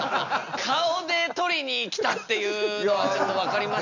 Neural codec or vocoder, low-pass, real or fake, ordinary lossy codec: none; 7.2 kHz; real; none